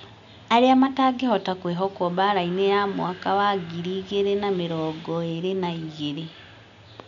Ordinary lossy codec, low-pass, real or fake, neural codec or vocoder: none; 7.2 kHz; real; none